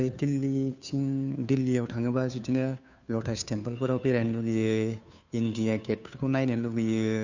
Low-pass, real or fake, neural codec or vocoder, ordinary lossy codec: 7.2 kHz; fake; codec, 16 kHz, 2 kbps, FunCodec, trained on Chinese and English, 25 frames a second; none